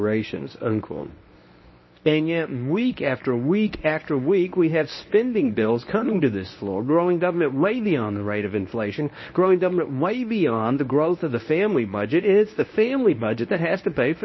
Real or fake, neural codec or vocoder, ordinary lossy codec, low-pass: fake; codec, 24 kHz, 0.9 kbps, WavTokenizer, medium speech release version 1; MP3, 24 kbps; 7.2 kHz